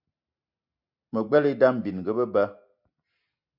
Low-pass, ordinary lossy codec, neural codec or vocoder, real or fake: 5.4 kHz; MP3, 48 kbps; none; real